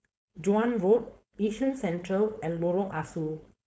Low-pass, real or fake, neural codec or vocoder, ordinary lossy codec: none; fake; codec, 16 kHz, 4.8 kbps, FACodec; none